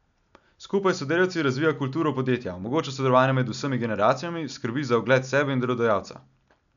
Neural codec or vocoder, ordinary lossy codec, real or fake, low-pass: none; none; real; 7.2 kHz